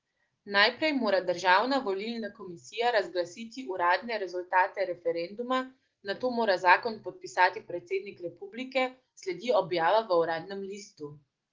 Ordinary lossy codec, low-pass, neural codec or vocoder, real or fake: Opus, 16 kbps; 7.2 kHz; none; real